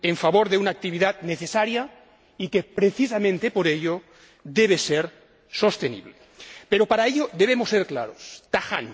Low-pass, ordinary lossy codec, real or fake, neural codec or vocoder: none; none; real; none